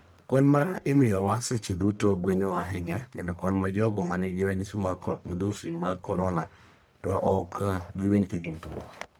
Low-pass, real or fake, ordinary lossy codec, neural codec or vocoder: none; fake; none; codec, 44.1 kHz, 1.7 kbps, Pupu-Codec